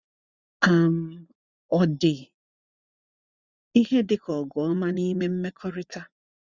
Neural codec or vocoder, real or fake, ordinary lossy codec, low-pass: vocoder, 24 kHz, 100 mel bands, Vocos; fake; Opus, 64 kbps; 7.2 kHz